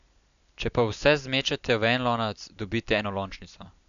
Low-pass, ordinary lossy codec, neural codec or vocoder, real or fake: 7.2 kHz; none; none; real